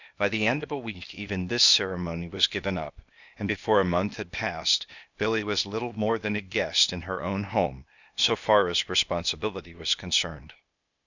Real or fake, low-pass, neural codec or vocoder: fake; 7.2 kHz; codec, 16 kHz, 0.8 kbps, ZipCodec